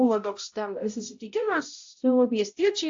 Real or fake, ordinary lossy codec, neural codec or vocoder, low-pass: fake; AAC, 48 kbps; codec, 16 kHz, 0.5 kbps, X-Codec, HuBERT features, trained on balanced general audio; 7.2 kHz